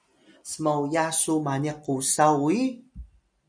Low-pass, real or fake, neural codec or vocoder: 9.9 kHz; real; none